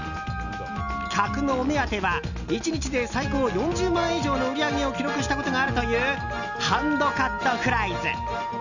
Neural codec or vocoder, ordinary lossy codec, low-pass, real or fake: none; none; 7.2 kHz; real